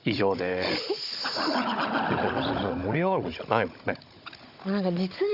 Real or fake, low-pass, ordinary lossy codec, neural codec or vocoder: fake; 5.4 kHz; Opus, 64 kbps; codec, 16 kHz, 16 kbps, FunCodec, trained on Chinese and English, 50 frames a second